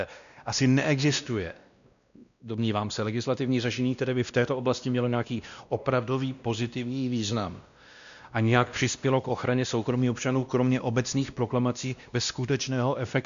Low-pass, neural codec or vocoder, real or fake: 7.2 kHz; codec, 16 kHz, 1 kbps, X-Codec, WavLM features, trained on Multilingual LibriSpeech; fake